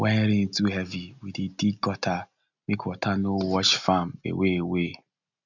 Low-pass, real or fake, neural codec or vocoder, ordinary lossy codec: 7.2 kHz; real; none; none